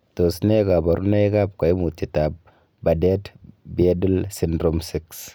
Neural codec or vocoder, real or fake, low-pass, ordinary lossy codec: none; real; none; none